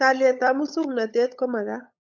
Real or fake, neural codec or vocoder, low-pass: fake; codec, 16 kHz, 8 kbps, FunCodec, trained on LibriTTS, 25 frames a second; 7.2 kHz